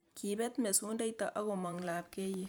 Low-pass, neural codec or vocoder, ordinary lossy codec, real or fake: none; vocoder, 44.1 kHz, 128 mel bands every 512 samples, BigVGAN v2; none; fake